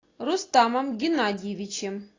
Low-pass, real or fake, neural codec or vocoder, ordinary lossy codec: 7.2 kHz; real; none; AAC, 32 kbps